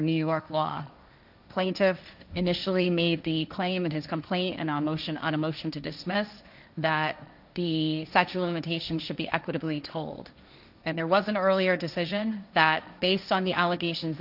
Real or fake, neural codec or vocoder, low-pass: fake; codec, 16 kHz, 1.1 kbps, Voila-Tokenizer; 5.4 kHz